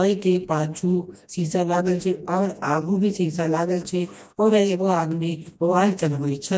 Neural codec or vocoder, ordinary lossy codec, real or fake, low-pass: codec, 16 kHz, 1 kbps, FreqCodec, smaller model; none; fake; none